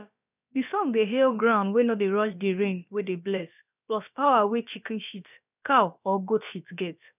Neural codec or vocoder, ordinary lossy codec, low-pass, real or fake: codec, 16 kHz, about 1 kbps, DyCAST, with the encoder's durations; none; 3.6 kHz; fake